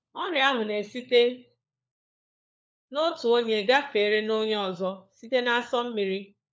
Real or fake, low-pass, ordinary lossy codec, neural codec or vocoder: fake; none; none; codec, 16 kHz, 4 kbps, FunCodec, trained on LibriTTS, 50 frames a second